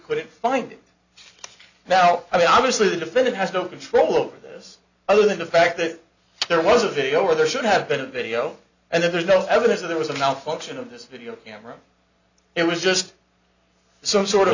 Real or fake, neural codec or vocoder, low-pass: real; none; 7.2 kHz